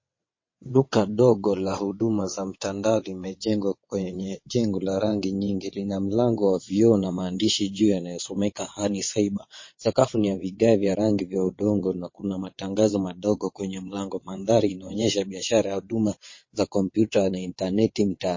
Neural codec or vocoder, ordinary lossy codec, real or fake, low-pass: vocoder, 22.05 kHz, 80 mel bands, WaveNeXt; MP3, 32 kbps; fake; 7.2 kHz